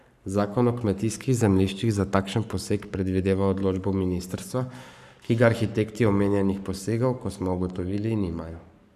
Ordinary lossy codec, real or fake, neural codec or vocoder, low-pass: none; fake; codec, 44.1 kHz, 7.8 kbps, Pupu-Codec; 14.4 kHz